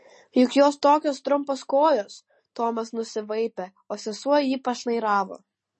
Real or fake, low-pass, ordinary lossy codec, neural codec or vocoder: real; 10.8 kHz; MP3, 32 kbps; none